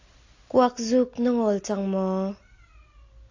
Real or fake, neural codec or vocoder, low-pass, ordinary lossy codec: real; none; 7.2 kHz; AAC, 48 kbps